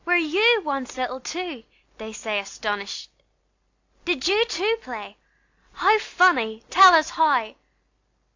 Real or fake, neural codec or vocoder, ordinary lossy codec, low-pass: real; none; AAC, 48 kbps; 7.2 kHz